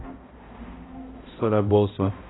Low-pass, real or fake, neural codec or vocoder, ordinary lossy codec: 7.2 kHz; fake; codec, 16 kHz, 0.5 kbps, X-Codec, HuBERT features, trained on balanced general audio; AAC, 16 kbps